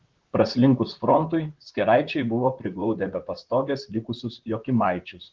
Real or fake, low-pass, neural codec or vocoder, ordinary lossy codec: fake; 7.2 kHz; vocoder, 44.1 kHz, 128 mel bands, Pupu-Vocoder; Opus, 16 kbps